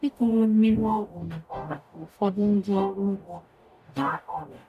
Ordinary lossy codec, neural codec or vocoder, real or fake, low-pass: none; codec, 44.1 kHz, 0.9 kbps, DAC; fake; 14.4 kHz